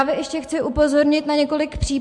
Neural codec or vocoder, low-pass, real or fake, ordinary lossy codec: none; 10.8 kHz; real; MP3, 64 kbps